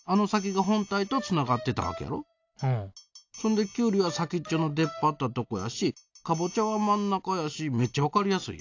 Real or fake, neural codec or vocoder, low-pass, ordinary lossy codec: real; none; 7.2 kHz; AAC, 48 kbps